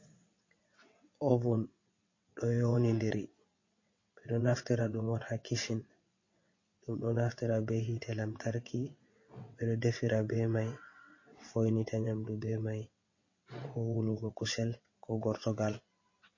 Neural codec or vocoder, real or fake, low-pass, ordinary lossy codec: vocoder, 22.05 kHz, 80 mel bands, WaveNeXt; fake; 7.2 kHz; MP3, 32 kbps